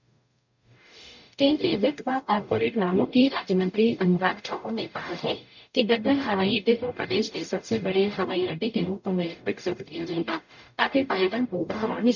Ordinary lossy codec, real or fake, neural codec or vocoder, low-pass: none; fake; codec, 44.1 kHz, 0.9 kbps, DAC; 7.2 kHz